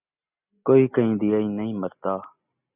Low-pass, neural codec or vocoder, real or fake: 3.6 kHz; none; real